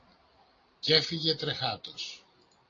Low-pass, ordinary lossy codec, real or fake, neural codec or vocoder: 7.2 kHz; AAC, 32 kbps; real; none